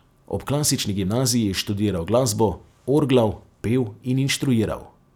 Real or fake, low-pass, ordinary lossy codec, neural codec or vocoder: real; 19.8 kHz; none; none